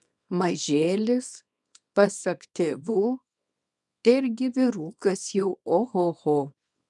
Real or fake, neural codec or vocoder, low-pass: fake; codec, 24 kHz, 0.9 kbps, WavTokenizer, small release; 10.8 kHz